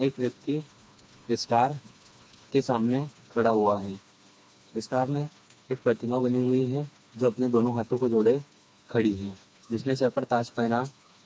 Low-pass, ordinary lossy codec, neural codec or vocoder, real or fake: none; none; codec, 16 kHz, 2 kbps, FreqCodec, smaller model; fake